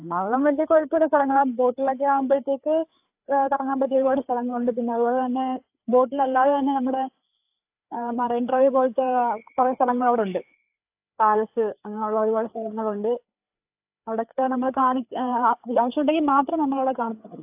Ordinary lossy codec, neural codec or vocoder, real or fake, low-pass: AAC, 32 kbps; codec, 16 kHz, 4 kbps, FreqCodec, larger model; fake; 3.6 kHz